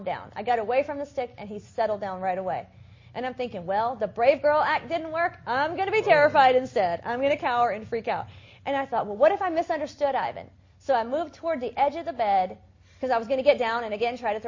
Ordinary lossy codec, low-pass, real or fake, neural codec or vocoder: MP3, 32 kbps; 7.2 kHz; real; none